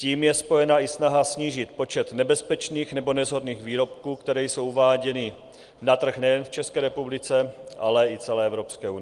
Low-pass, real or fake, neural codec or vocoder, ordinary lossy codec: 10.8 kHz; real; none; Opus, 24 kbps